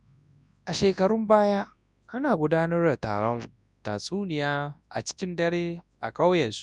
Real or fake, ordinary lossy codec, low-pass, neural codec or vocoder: fake; none; 10.8 kHz; codec, 24 kHz, 0.9 kbps, WavTokenizer, large speech release